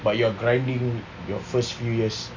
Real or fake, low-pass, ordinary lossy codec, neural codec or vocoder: real; 7.2 kHz; none; none